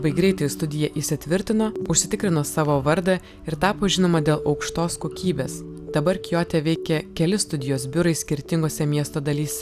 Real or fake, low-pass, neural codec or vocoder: real; 14.4 kHz; none